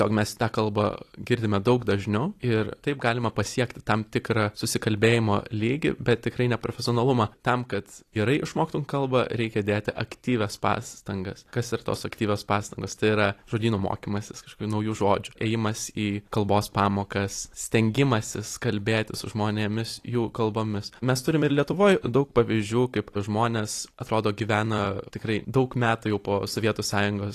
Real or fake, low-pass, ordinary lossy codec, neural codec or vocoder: fake; 14.4 kHz; AAC, 48 kbps; vocoder, 44.1 kHz, 128 mel bands every 256 samples, BigVGAN v2